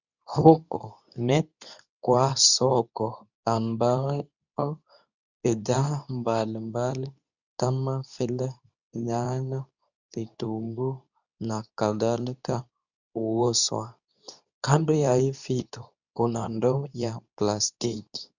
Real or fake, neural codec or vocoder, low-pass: fake; codec, 24 kHz, 0.9 kbps, WavTokenizer, medium speech release version 2; 7.2 kHz